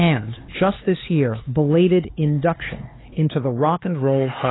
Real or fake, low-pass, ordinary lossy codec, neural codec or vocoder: fake; 7.2 kHz; AAC, 16 kbps; codec, 16 kHz, 4 kbps, X-Codec, HuBERT features, trained on LibriSpeech